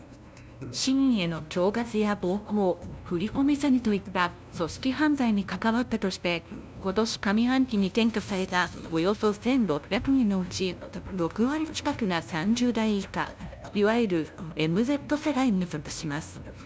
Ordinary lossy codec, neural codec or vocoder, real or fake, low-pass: none; codec, 16 kHz, 0.5 kbps, FunCodec, trained on LibriTTS, 25 frames a second; fake; none